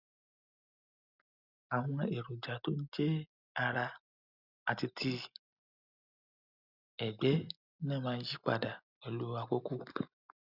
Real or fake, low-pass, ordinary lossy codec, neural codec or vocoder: real; 5.4 kHz; none; none